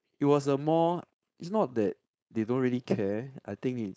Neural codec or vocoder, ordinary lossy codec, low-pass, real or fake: codec, 16 kHz, 4.8 kbps, FACodec; none; none; fake